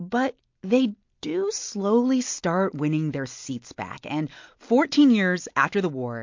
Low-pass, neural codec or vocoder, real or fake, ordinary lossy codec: 7.2 kHz; none; real; MP3, 48 kbps